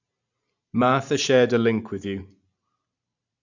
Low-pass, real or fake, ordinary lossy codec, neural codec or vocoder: 7.2 kHz; fake; none; vocoder, 44.1 kHz, 128 mel bands every 512 samples, BigVGAN v2